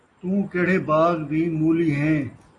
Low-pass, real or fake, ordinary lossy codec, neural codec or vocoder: 10.8 kHz; real; AAC, 32 kbps; none